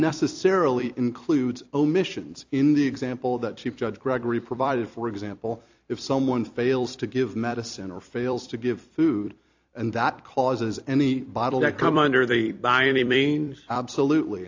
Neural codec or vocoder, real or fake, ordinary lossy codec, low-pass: vocoder, 44.1 kHz, 128 mel bands every 256 samples, BigVGAN v2; fake; AAC, 48 kbps; 7.2 kHz